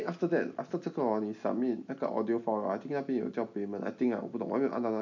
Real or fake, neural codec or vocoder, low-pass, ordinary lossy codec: real; none; 7.2 kHz; none